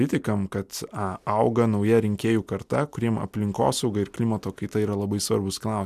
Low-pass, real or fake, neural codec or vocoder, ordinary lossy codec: 14.4 kHz; real; none; MP3, 96 kbps